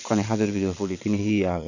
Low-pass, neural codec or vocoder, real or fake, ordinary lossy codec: 7.2 kHz; vocoder, 22.05 kHz, 80 mel bands, Vocos; fake; none